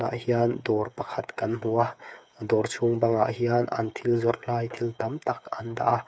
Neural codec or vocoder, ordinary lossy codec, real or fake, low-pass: codec, 16 kHz, 8 kbps, FreqCodec, smaller model; none; fake; none